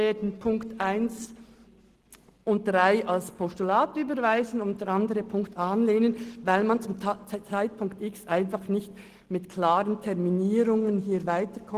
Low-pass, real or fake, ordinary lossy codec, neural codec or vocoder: 14.4 kHz; real; Opus, 16 kbps; none